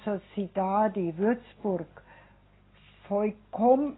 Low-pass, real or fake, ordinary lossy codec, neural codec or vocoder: 7.2 kHz; real; AAC, 16 kbps; none